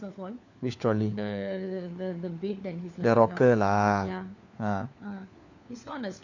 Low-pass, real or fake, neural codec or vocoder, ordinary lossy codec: 7.2 kHz; fake; codec, 16 kHz, 4 kbps, FunCodec, trained on LibriTTS, 50 frames a second; none